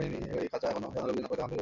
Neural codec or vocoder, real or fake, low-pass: none; real; 7.2 kHz